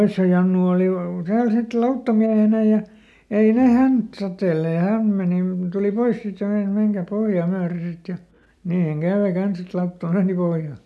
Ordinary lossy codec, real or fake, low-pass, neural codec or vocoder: none; real; none; none